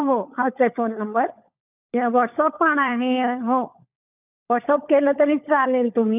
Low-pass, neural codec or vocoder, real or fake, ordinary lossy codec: 3.6 kHz; codec, 16 kHz, 16 kbps, FunCodec, trained on LibriTTS, 50 frames a second; fake; none